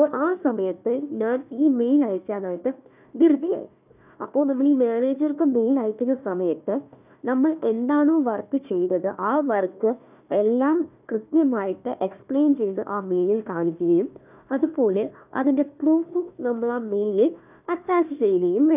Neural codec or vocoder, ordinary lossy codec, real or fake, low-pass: codec, 16 kHz, 1 kbps, FunCodec, trained on Chinese and English, 50 frames a second; none; fake; 3.6 kHz